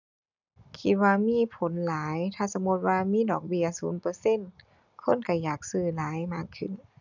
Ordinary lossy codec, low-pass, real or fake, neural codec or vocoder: none; 7.2 kHz; real; none